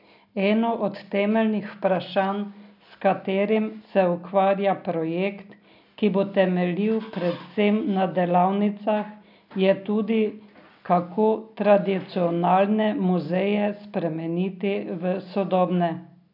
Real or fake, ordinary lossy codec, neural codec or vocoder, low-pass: real; none; none; 5.4 kHz